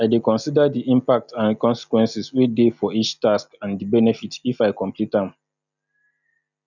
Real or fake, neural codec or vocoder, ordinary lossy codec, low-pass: real; none; none; 7.2 kHz